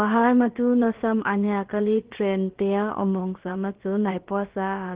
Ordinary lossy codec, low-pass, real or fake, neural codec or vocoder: Opus, 16 kbps; 3.6 kHz; fake; codec, 16 kHz, about 1 kbps, DyCAST, with the encoder's durations